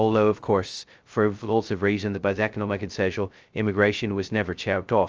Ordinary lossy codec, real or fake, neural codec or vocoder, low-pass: Opus, 24 kbps; fake; codec, 16 kHz, 0.2 kbps, FocalCodec; 7.2 kHz